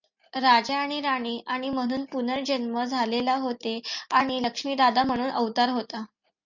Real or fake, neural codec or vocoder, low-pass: real; none; 7.2 kHz